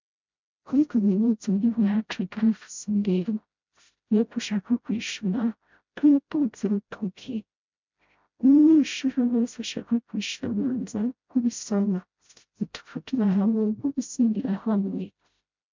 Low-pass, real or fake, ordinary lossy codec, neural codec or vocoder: 7.2 kHz; fake; MP3, 64 kbps; codec, 16 kHz, 0.5 kbps, FreqCodec, smaller model